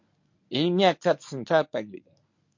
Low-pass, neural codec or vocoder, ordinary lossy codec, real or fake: 7.2 kHz; codec, 24 kHz, 0.9 kbps, WavTokenizer, medium speech release version 1; MP3, 48 kbps; fake